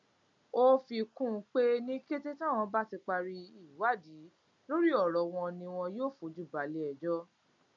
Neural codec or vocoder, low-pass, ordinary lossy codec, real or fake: none; 7.2 kHz; MP3, 64 kbps; real